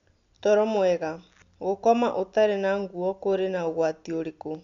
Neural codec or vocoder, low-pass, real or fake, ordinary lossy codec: none; 7.2 kHz; real; none